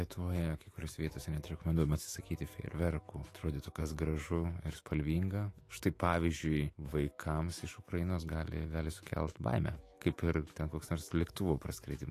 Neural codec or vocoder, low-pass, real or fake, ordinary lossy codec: autoencoder, 48 kHz, 128 numbers a frame, DAC-VAE, trained on Japanese speech; 14.4 kHz; fake; AAC, 48 kbps